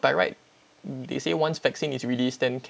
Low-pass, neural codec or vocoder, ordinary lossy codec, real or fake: none; none; none; real